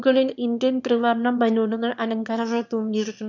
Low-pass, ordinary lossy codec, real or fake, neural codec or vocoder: 7.2 kHz; none; fake; autoencoder, 22.05 kHz, a latent of 192 numbers a frame, VITS, trained on one speaker